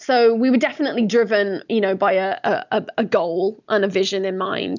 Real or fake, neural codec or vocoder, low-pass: real; none; 7.2 kHz